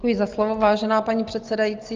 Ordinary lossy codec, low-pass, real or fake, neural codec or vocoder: Opus, 32 kbps; 7.2 kHz; real; none